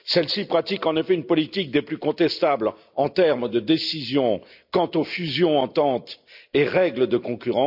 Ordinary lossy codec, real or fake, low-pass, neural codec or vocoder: none; real; 5.4 kHz; none